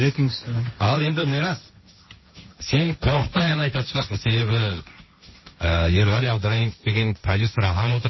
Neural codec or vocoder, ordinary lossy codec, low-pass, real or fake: codec, 16 kHz, 1.1 kbps, Voila-Tokenizer; MP3, 24 kbps; 7.2 kHz; fake